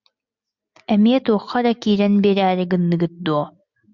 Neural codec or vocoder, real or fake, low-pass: none; real; 7.2 kHz